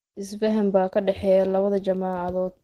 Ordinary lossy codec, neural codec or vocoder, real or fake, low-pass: Opus, 16 kbps; none; real; 14.4 kHz